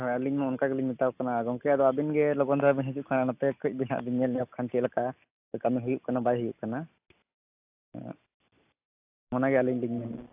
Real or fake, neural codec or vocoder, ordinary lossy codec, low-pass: real; none; AAC, 32 kbps; 3.6 kHz